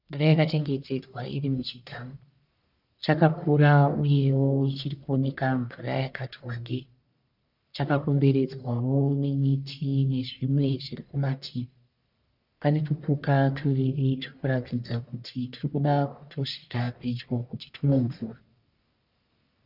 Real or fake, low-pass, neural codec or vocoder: fake; 5.4 kHz; codec, 44.1 kHz, 1.7 kbps, Pupu-Codec